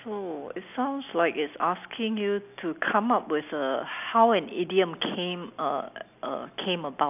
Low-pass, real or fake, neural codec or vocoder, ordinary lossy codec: 3.6 kHz; real; none; none